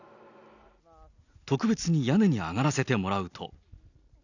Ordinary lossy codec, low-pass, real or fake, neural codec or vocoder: none; 7.2 kHz; real; none